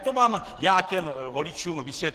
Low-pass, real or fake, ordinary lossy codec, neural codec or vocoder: 14.4 kHz; fake; Opus, 16 kbps; codec, 44.1 kHz, 2.6 kbps, SNAC